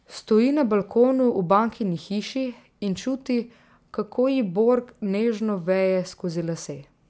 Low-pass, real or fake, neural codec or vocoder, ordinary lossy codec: none; real; none; none